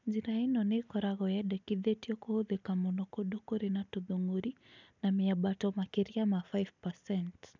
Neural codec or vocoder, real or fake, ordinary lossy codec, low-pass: none; real; none; 7.2 kHz